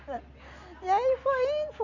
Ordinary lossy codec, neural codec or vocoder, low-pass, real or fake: none; none; 7.2 kHz; real